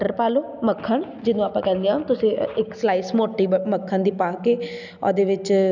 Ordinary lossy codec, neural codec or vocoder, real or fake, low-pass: none; none; real; 7.2 kHz